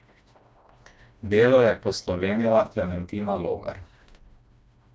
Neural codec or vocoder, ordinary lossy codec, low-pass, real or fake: codec, 16 kHz, 1 kbps, FreqCodec, smaller model; none; none; fake